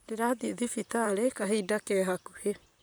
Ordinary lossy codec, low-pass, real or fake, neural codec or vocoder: none; none; fake; vocoder, 44.1 kHz, 128 mel bands, Pupu-Vocoder